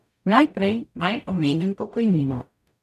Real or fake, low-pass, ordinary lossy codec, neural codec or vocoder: fake; 14.4 kHz; none; codec, 44.1 kHz, 0.9 kbps, DAC